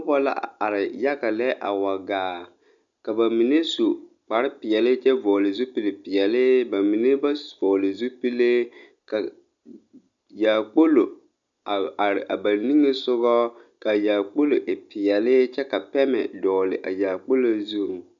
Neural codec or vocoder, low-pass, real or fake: none; 7.2 kHz; real